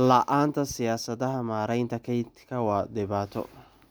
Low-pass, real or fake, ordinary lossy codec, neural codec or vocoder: none; real; none; none